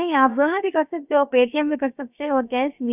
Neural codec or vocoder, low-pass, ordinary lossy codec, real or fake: codec, 16 kHz, about 1 kbps, DyCAST, with the encoder's durations; 3.6 kHz; none; fake